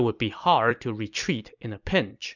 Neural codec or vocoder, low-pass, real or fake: vocoder, 44.1 kHz, 128 mel bands every 256 samples, BigVGAN v2; 7.2 kHz; fake